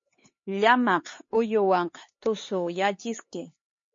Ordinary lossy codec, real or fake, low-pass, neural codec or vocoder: MP3, 32 kbps; fake; 7.2 kHz; codec, 16 kHz, 4 kbps, X-Codec, HuBERT features, trained on LibriSpeech